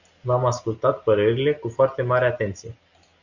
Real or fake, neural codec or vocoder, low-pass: real; none; 7.2 kHz